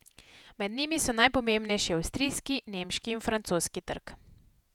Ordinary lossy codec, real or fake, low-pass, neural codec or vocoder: none; fake; 19.8 kHz; vocoder, 48 kHz, 128 mel bands, Vocos